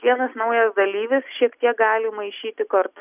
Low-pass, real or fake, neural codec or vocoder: 3.6 kHz; real; none